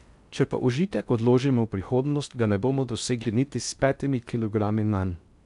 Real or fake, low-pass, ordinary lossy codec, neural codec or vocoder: fake; 10.8 kHz; none; codec, 16 kHz in and 24 kHz out, 0.6 kbps, FocalCodec, streaming, 4096 codes